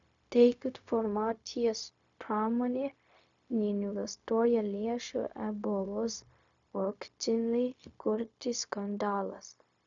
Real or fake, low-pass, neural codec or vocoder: fake; 7.2 kHz; codec, 16 kHz, 0.4 kbps, LongCat-Audio-Codec